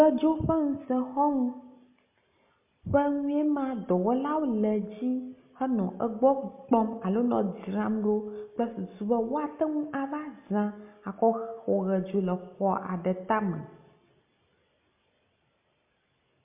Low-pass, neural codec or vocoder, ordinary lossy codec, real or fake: 3.6 kHz; none; MP3, 32 kbps; real